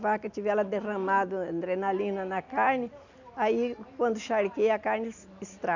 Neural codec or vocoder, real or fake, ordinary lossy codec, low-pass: none; real; none; 7.2 kHz